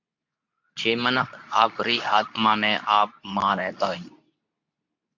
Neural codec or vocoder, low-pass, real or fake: codec, 24 kHz, 0.9 kbps, WavTokenizer, medium speech release version 2; 7.2 kHz; fake